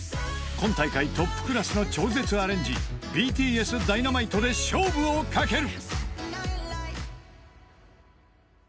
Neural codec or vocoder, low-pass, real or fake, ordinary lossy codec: none; none; real; none